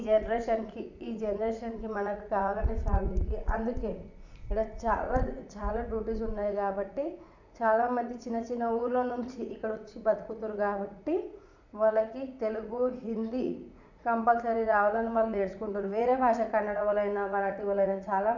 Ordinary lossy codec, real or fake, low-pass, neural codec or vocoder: none; fake; 7.2 kHz; vocoder, 44.1 kHz, 80 mel bands, Vocos